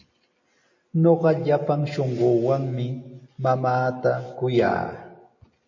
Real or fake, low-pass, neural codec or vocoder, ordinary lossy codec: real; 7.2 kHz; none; MP3, 32 kbps